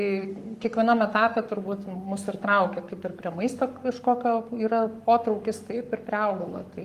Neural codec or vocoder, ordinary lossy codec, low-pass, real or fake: codec, 44.1 kHz, 7.8 kbps, Pupu-Codec; Opus, 32 kbps; 14.4 kHz; fake